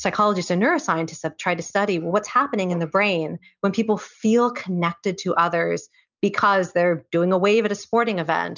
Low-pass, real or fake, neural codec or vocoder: 7.2 kHz; real; none